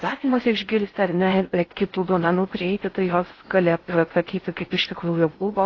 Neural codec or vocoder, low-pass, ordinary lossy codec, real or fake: codec, 16 kHz in and 24 kHz out, 0.6 kbps, FocalCodec, streaming, 4096 codes; 7.2 kHz; AAC, 32 kbps; fake